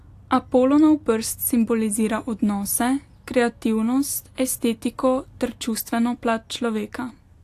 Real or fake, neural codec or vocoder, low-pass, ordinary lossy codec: real; none; 14.4 kHz; AAC, 64 kbps